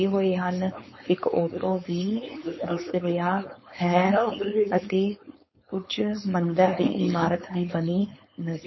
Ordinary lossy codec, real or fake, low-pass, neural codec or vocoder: MP3, 24 kbps; fake; 7.2 kHz; codec, 16 kHz, 4.8 kbps, FACodec